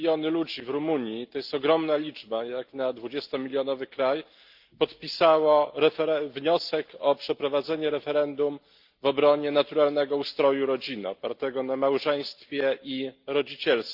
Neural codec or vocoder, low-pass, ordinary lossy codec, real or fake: none; 5.4 kHz; Opus, 32 kbps; real